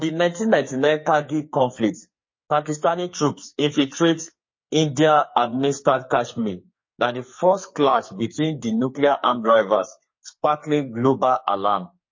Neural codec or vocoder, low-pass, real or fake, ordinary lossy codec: codec, 44.1 kHz, 2.6 kbps, SNAC; 7.2 kHz; fake; MP3, 32 kbps